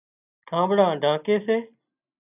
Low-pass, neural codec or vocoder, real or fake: 3.6 kHz; none; real